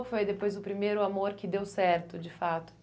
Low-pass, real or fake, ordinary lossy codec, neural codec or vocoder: none; real; none; none